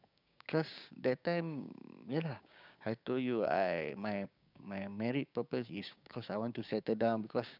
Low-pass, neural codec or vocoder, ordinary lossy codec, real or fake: 5.4 kHz; codec, 16 kHz, 6 kbps, DAC; none; fake